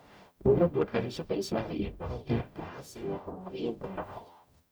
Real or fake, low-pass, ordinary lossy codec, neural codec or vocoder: fake; none; none; codec, 44.1 kHz, 0.9 kbps, DAC